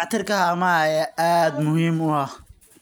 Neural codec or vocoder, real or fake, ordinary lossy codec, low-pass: none; real; none; none